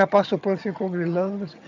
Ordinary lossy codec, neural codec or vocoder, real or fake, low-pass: none; vocoder, 22.05 kHz, 80 mel bands, HiFi-GAN; fake; 7.2 kHz